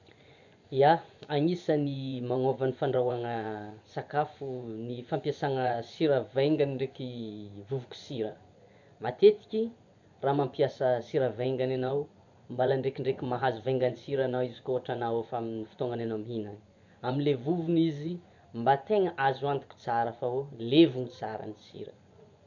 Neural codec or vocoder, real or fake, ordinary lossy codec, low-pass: vocoder, 24 kHz, 100 mel bands, Vocos; fake; none; 7.2 kHz